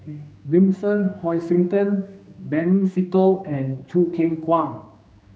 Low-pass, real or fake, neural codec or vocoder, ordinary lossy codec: none; fake; codec, 16 kHz, 2 kbps, X-Codec, HuBERT features, trained on general audio; none